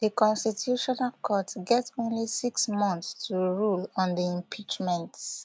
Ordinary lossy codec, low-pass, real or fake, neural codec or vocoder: none; none; real; none